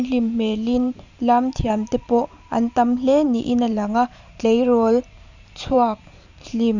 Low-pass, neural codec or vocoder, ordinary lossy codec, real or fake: 7.2 kHz; vocoder, 44.1 kHz, 128 mel bands every 512 samples, BigVGAN v2; none; fake